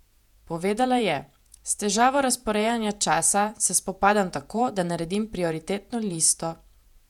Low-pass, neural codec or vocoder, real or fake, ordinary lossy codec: 19.8 kHz; vocoder, 48 kHz, 128 mel bands, Vocos; fake; none